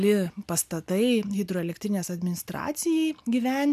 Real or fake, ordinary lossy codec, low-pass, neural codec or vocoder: real; MP3, 96 kbps; 14.4 kHz; none